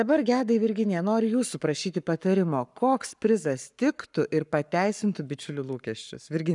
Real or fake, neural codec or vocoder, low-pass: fake; codec, 44.1 kHz, 7.8 kbps, Pupu-Codec; 10.8 kHz